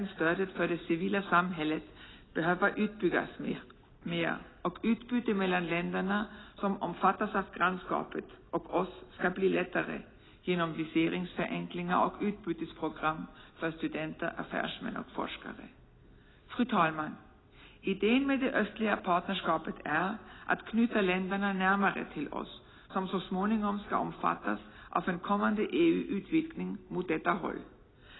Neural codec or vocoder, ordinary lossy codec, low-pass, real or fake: none; AAC, 16 kbps; 7.2 kHz; real